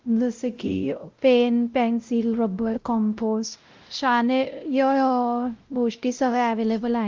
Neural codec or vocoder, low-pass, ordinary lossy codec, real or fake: codec, 16 kHz, 0.5 kbps, X-Codec, WavLM features, trained on Multilingual LibriSpeech; 7.2 kHz; Opus, 32 kbps; fake